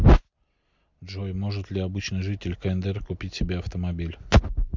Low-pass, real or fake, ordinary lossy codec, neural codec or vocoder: 7.2 kHz; real; MP3, 64 kbps; none